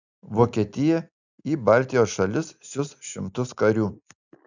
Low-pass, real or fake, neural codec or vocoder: 7.2 kHz; real; none